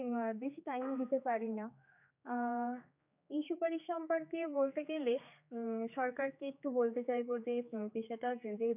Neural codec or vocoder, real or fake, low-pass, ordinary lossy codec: codec, 16 kHz, 4 kbps, X-Codec, HuBERT features, trained on general audio; fake; 3.6 kHz; none